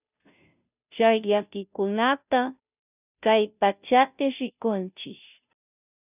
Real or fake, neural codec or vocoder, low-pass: fake; codec, 16 kHz, 0.5 kbps, FunCodec, trained on Chinese and English, 25 frames a second; 3.6 kHz